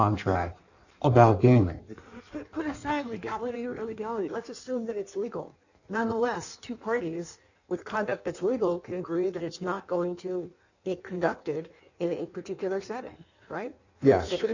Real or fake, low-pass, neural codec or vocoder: fake; 7.2 kHz; codec, 16 kHz in and 24 kHz out, 1.1 kbps, FireRedTTS-2 codec